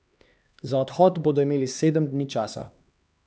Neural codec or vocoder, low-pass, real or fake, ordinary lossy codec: codec, 16 kHz, 2 kbps, X-Codec, HuBERT features, trained on LibriSpeech; none; fake; none